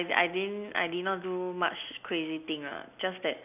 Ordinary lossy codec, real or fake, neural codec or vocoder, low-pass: none; real; none; 3.6 kHz